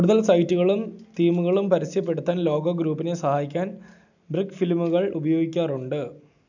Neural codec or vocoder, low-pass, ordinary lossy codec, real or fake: none; 7.2 kHz; none; real